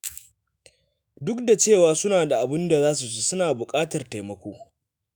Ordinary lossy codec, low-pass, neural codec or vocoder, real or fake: none; none; autoencoder, 48 kHz, 128 numbers a frame, DAC-VAE, trained on Japanese speech; fake